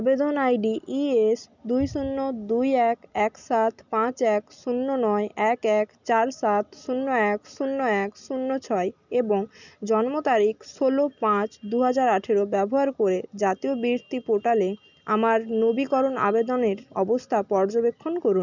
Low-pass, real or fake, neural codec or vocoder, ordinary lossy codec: 7.2 kHz; real; none; none